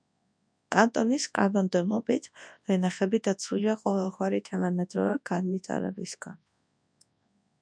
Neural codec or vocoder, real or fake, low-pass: codec, 24 kHz, 0.9 kbps, WavTokenizer, large speech release; fake; 9.9 kHz